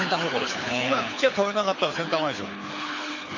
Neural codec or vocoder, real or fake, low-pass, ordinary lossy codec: codec, 24 kHz, 6 kbps, HILCodec; fake; 7.2 kHz; MP3, 32 kbps